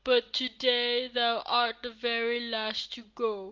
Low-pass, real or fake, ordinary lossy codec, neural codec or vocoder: 7.2 kHz; real; Opus, 32 kbps; none